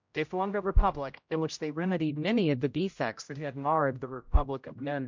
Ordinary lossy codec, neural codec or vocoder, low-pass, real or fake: MP3, 64 kbps; codec, 16 kHz, 0.5 kbps, X-Codec, HuBERT features, trained on general audio; 7.2 kHz; fake